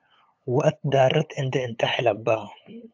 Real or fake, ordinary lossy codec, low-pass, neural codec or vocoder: fake; AAC, 48 kbps; 7.2 kHz; codec, 16 kHz, 8 kbps, FunCodec, trained on LibriTTS, 25 frames a second